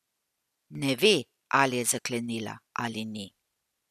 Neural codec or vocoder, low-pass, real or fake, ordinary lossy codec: none; 14.4 kHz; real; none